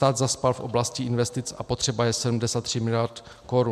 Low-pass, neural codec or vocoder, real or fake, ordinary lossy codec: 14.4 kHz; none; real; MP3, 96 kbps